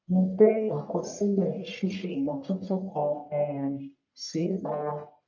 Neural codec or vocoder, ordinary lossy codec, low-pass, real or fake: codec, 44.1 kHz, 1.7 kbps, Pupu-Codec; none; 7.2 kHz; fake